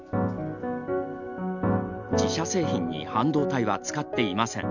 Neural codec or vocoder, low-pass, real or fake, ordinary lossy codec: none; 7.2 kHz; real; none